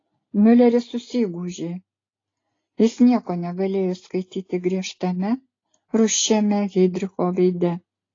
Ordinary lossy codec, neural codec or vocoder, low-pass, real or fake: AAC, 32 kbps; none; 7.2 kHz; real